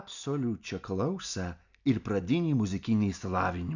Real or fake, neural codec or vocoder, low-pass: real; none; 7.2 kHz